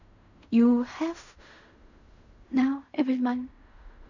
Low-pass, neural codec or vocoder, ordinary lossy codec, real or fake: 7.2 kHz; codec, 16 kHz in and 24 kHz out, 0.4 kbps, LongCat-Audio-Codec, fine tuned four codebook decoder; none; fake